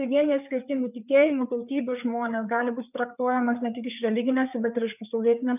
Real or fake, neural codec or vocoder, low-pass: fake; codec, 16 kHz, 4 kbps, FreqCodec, larger model; 3.6 kHz